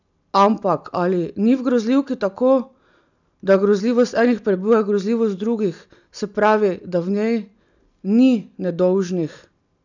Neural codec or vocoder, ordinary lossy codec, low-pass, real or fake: none; none; 7.2 kHz; real